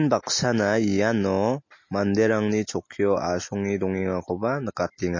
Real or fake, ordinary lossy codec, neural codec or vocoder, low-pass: real; MP3, 32 kbps; none; 7.2 kHz